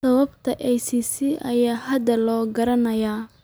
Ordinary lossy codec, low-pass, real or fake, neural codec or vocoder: none; none; real; none